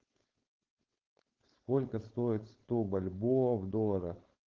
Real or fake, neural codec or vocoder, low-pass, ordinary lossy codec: fake; codec, 16 kHz, 4.8 kbps, FACodec; 7.2 kHz; Opus, 16 kbps